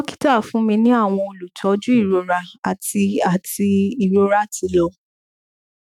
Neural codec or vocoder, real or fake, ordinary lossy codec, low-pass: autoencoder, 48 kHz, 128 numbers a frame, DAC-VAE, trained on Japanese speech; fake; none; 19.8 kHz